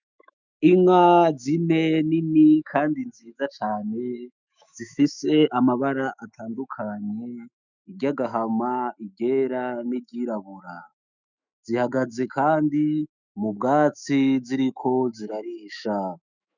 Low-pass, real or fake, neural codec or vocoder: 7.2 kHz; fake; autoencoder, 48 kHz, 128 numbers a frame, DAC-VAE, trained on Japanese speech